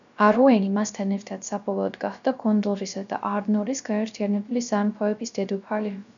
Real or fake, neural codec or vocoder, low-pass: fake; codec, 16 kHz, 0.3 kbps, FocalCodec; 7.2 kHz